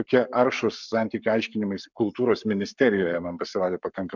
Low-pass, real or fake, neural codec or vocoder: 7.2 kHz; fake; codec, 44.1 kHz, 7.8 kbps, Pupu-Codec